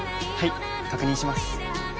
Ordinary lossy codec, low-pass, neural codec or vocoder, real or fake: none; none; none; real